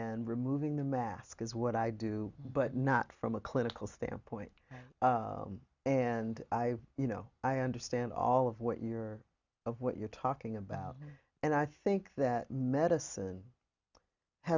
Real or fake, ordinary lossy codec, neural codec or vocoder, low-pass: real; Opus, 64 kbps; none; 7.2 kHz